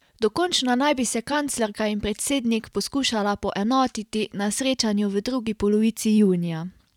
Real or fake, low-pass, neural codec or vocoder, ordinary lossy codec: fake; 19.8 kHz; vocoder, 44.1 kHz, 128 mel bands every 512 samples, BigVGAN v2; none